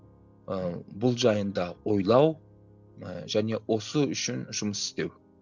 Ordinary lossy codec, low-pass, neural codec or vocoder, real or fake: none; 7.2 kHz; none; real